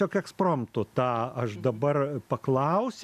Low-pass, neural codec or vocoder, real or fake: 14.4 kHz; none; real